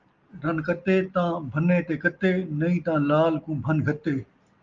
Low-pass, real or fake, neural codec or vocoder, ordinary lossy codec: 7.2 kHz; real; none; Opus, 24 kbps